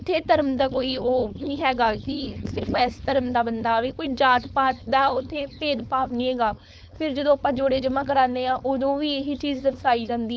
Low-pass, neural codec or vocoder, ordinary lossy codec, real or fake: none; codec, 16 kHz, 4.8 kbps, FACodec; none; fake